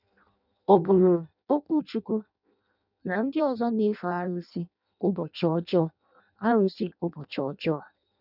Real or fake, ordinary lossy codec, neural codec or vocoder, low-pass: fake; none; codec, 16 kHz in and 24 kHz out, 0.6 kbps, FireRedTTS-2 codec; 5.4 kHz